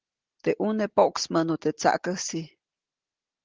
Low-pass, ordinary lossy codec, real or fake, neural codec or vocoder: 7.2 kHz; Opus, 16 kbps; real; none